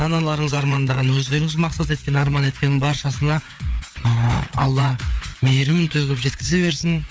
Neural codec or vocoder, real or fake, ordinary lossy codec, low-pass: codec, 16 kHz, 4 kbps, FreqCodec, larger model; fake; none; none